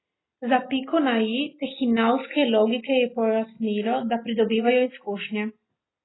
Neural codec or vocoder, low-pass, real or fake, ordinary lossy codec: none; 7.2 kHz; real; AAC, 16 kbps